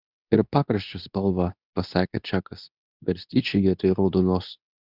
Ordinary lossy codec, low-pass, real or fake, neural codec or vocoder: Opus, 64 kbps; 5.4 kHz; fake; codec, 24 kHz, 0.9 kbps, WavTokenizer, medium speech release version 2